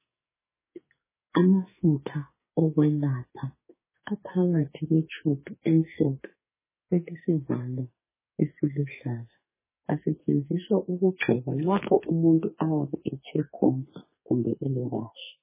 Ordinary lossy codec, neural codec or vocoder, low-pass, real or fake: MP3, 16 kbps; codec, 44.1 kHz, 2.6 kbps, SNAC; 3.6 kHz; fake